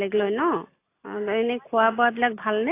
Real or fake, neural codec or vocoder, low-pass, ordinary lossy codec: real; none; 3.6 kHz; AAC, 24 kbps